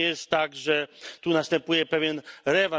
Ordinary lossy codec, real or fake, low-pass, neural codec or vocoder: none; real; none; none